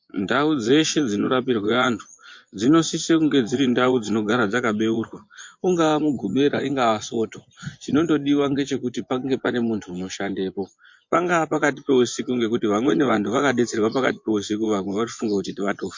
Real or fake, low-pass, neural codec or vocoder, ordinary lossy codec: fake; 7.2 kHz; vocoder, 24 kHz, 100 mel bands, Vocos; MP3, 48 kbps